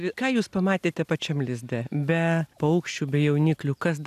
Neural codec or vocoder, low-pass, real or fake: none; 14.4 kHz; real